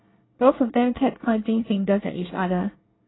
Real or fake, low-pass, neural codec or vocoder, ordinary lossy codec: fake; 7.2 kHz; codec, 24 kHz, 1 kbps, SNAC; AAC, 16 kbps